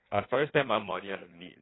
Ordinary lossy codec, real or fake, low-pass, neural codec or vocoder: AAC, 16 kbps; fake; 7.2 kHz; codec, 16 kHz in and 24 kHz out, 1.1 kbps, FireRedTTS-2 codec